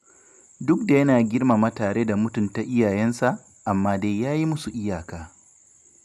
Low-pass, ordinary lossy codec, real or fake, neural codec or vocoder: 14.4 kHz; none; real; none